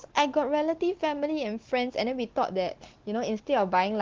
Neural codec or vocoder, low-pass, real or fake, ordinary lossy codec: none; 7.2 kHz; real; Opus, 16 kbps